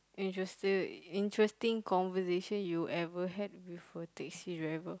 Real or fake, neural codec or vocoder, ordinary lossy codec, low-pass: real; none; none; none